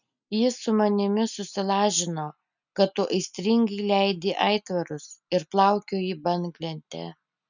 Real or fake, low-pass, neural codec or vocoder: real; 7.2 kHz; none